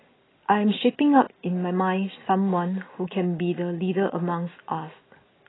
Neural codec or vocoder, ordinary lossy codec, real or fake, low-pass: none; AAC, 16 kbps; real; 7.2 kHz